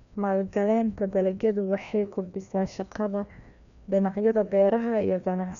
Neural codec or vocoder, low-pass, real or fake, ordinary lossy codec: codec, 16 kHz, 1 kbps, FreqCodec, larger model; 7.2 kHz; fake; none